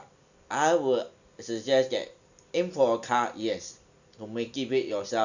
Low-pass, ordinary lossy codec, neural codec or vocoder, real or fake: 7.2 kHz; none; none; real